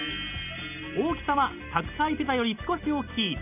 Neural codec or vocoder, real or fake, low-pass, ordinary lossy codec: none; real; 3.6 kHz; none